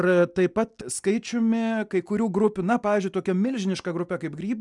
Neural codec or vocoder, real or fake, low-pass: none; real; 10.8 kHz